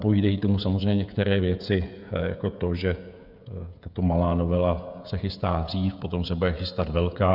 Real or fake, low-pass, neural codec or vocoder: fake; 5.4 kHz; codec, 16 kHz, 16 kbps, FreqCodec, smaller model